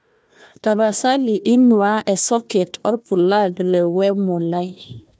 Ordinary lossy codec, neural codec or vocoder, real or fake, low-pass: none; codec, 16 kHz, 1 kbps, FunCodec, trained on Chinese and English, 50 frames a second; fake; none